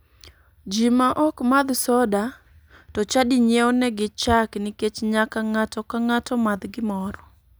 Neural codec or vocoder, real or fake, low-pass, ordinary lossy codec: none; real; none; none